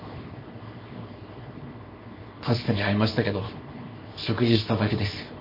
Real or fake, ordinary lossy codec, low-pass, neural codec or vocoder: fake; MP3, 24 kbps; 5.4 kHz; codec, 24 kHz, 0.9 kbps, WavTokenizer, small release